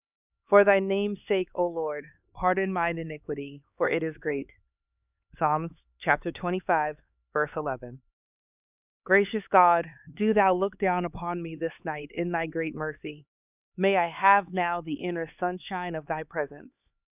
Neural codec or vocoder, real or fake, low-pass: codec, 16 kHz, 4 kbps, X-Codec, HuBERT features, trained on LibriSpeech; fake; 3.6 kHz